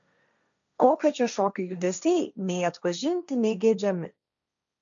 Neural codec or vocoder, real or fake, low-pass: codec, 16 kHz, 1.1 kbps, Voila-Tokenizer; fake; 7.2 kHz